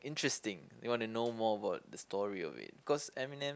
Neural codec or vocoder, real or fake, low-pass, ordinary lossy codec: none; real; none; none